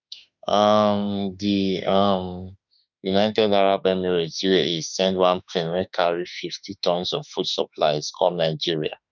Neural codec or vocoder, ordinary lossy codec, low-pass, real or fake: autoencoder, 48 kHz, 32 numbers a frame, DAC-VAE, trained on Japanese speech; none; 7.2 kHz; fake